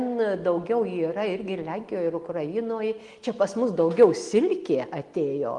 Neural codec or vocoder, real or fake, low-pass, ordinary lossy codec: none; real; 10.8 kHz; Opus, 24 kbps